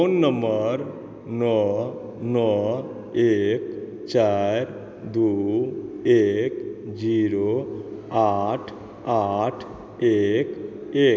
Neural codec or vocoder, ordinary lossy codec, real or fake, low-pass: none; none; real; none